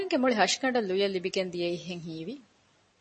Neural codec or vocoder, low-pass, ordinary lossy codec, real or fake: none; 9.9 kHz; MP3, 32 kbps; real